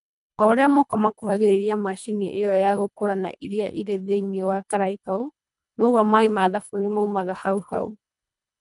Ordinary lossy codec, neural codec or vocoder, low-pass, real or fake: none; codec, 24 kHz, 1.5 kbps, HILCodec; 10.8 kHz; fake